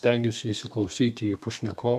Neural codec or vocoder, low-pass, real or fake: codec, 32 kHz, 1.9 kbps, SNAC; 14.4 kHz; fake